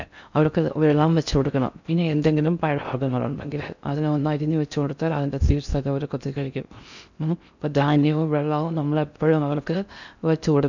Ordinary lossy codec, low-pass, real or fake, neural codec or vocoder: none; 7.2 kHz; fake; codec, 16 kHz in and 24 kHz out, 0.6 kbps, FocalCodec, streaming, 4096 codes